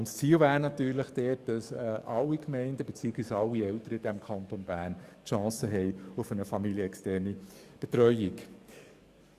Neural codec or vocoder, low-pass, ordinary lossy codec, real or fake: codec, 44.1 kHz, 7.8 kbps, DAC; 14.4 kHz; Opus, 64 kbps; fake